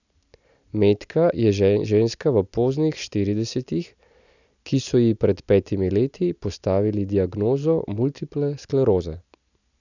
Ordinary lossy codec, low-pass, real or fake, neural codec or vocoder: none; 7.2 kHz; real; none